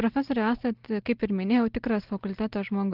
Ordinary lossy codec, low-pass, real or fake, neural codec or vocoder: Opus, 16 kbps; 5.4 kHz; real; none